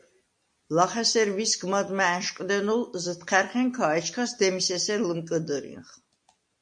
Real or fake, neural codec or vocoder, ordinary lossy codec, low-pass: real; none; MP3, 48 kbps; 9.9 kHz